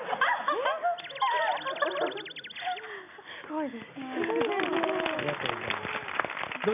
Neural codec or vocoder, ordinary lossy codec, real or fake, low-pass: none; none; real; 3.6 kHz